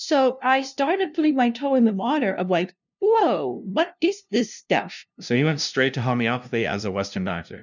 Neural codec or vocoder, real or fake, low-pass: codec, 16 kHz, 0.5 kbps, FunCodec, trained on LibriTTS, 25 frames a second; fake; 7.2 kHz